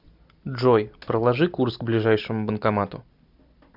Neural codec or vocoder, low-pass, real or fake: none; 5.4 kHz; real